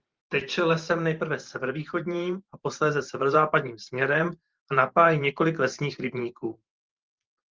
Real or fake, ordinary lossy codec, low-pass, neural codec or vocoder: fake; Opus, 32 kbps; 7.2 kHz; vocoder, 44.1 kHz, 128 mel bands every 512 samples, BigVGAN v2